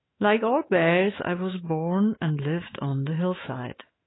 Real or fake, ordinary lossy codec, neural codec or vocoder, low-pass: fake; AAC, 16 kbps; autoencoder, 48 kHz, 128 numbers a frame, DAC-VAE, trained on Japanese speech; 7.2 kHz